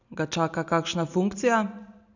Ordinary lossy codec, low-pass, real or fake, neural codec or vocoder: none; 7.2 kHz; real; none